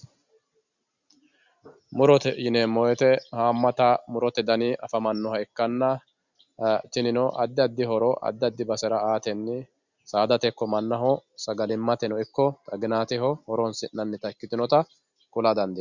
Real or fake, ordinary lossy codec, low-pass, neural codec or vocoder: real; Opus, 64 kbps; 7.2 kHz; none